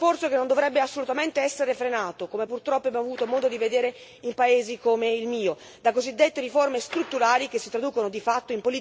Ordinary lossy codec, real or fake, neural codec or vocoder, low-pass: none; real; none; none